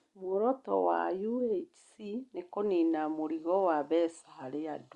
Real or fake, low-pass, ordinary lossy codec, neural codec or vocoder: real; 10.8 kHz; Opus, 64 kbps; none